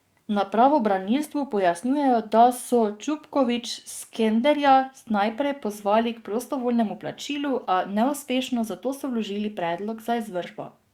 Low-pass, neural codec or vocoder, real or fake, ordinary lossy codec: 19.8 kHz; codec, 44.1 kHz, 7.8 kbps, DAC; fake; Opus, 64 kbps